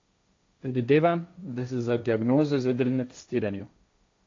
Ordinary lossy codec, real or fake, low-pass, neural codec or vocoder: AAC, 48 kbps; fake; 7.2 kHz; codec, 16 kHz, 1.1 kbps, Voila-Tokenizer